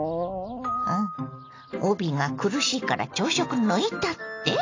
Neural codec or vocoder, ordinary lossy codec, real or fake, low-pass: none; AAC, 32 kbps; real; 7.2 kHz